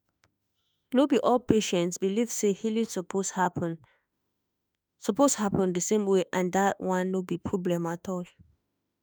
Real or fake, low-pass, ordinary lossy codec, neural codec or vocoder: fake; none; none; autoencoder, 48 kHz, 32 numbers a frame, DAC-VAE, trained on Japanese speech